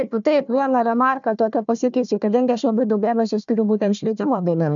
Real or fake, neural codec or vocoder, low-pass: fake; codec, 16 kHz, 1 kbps, FunCodec, trained on Chinese and English, 50 frames a second; 7.2 kHz